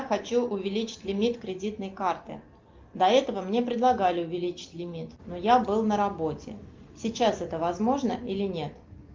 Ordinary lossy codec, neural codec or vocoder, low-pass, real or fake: Opus, 32 kbps; none; 7.2 kHz; real